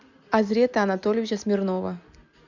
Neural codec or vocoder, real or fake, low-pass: none; real; 7.2 kHz